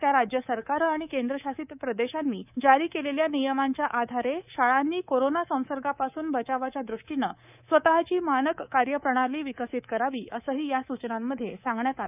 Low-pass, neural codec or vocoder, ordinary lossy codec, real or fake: 3.6 kHz; codec, 24 kHz, 3.1 kbps, DualCodec; none; fake